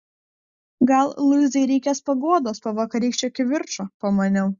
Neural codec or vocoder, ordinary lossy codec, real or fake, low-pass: none; Opus, 64 kbps; real; 7.2 kHz